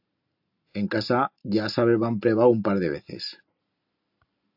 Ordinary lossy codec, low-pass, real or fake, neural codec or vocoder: AAC, 48 kbps; 5.4 kHz; real; none